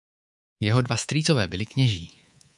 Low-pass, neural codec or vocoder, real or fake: 10.8 kHz; codec, 24 kHz, 3.1 kbps, DualCodec; fake